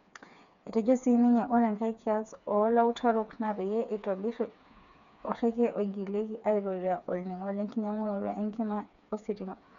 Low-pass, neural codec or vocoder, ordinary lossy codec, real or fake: 7.2 kHz; codec, 16 kHz, 4 kbps, FreqCodec, smaller model; none; fake